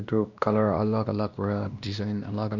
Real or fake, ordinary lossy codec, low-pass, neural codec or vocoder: fake; none; 7.2 kHz; codec, 16 kHz, 1 kbps, X-Codec, WavLM features, trained on Multilingual LibriSpeech